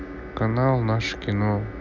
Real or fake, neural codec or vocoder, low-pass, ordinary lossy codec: real; none; 7.2 kHz; none